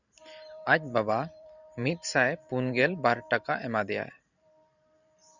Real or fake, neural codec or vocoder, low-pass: fake; vocoder, 44.1 kHz, 128 mel bands every 512 samples, BigVGAN v2; 7.2 kHz